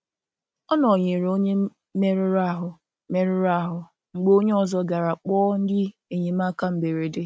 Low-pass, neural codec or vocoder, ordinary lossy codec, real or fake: none; none; none; real